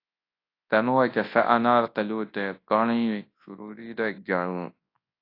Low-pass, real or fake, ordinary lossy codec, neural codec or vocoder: 5.4 kHz; fake; AAC, 32 kbps; codec, 24 kHz, 0.9 kbps, WavTokenizer, large speech release